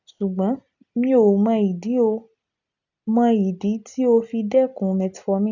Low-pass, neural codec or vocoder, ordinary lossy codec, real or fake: 7.2 kHz; none; none; real